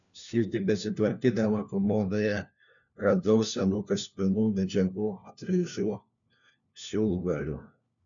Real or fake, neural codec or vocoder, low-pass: fake; codec, 16 kHz, 1 kbps, FunCodec, trained on LibriTTS, 50 frames a second; 7.2 kHz